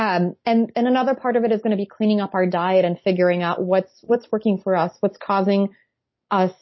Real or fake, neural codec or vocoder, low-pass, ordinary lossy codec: real; none; 7.2 kHz; MP3, 24 kbps